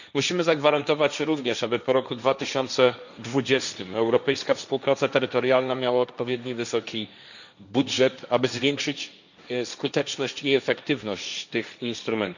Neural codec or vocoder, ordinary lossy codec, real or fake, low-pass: codec, 16 kHz, 1.1 kbps, Voila-Tokenizer; none; fake; 7.2 kHz